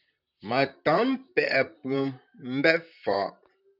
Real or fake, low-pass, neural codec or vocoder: fake; 5.4 kHz; vocoder, 44.1 kHz, 128 mel bands, Pupu-Vocoder